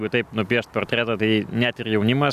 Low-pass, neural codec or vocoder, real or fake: 14.4 kHz; none; real